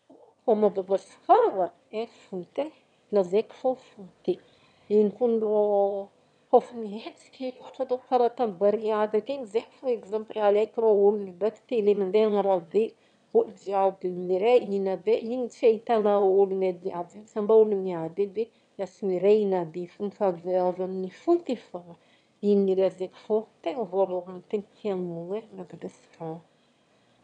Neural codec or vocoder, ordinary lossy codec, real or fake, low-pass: autoencoder, 22.05 kHz, a latent of 192 numbers a frame, VITS, trained on one speaker; none; fake; 9.9 kHz